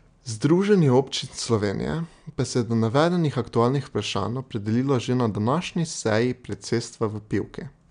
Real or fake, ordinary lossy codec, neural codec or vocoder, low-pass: real; MP3, 96 kbps; none; 9.9 kHz